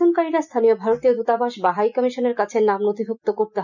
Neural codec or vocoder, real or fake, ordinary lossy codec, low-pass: none; real; none; 7.2 kHz